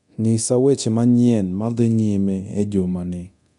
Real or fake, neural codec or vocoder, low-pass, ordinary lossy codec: fake; codec, 24 kHz, 0.9 kbps, DualCodec; 10.8 kHz; none